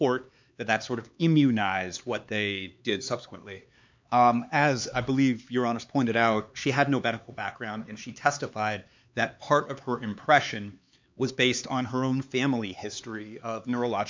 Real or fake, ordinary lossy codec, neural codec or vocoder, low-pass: fake; MP3, 64 kbps; codec, 16 kHz, 4 kbps, X-Codec, HuBERT features, trained on LibriSpeech; 7.2 kHz